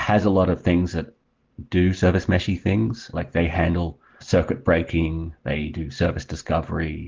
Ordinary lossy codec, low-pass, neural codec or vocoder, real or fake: Opus, 16 kbps; 7.2 kHz; none; real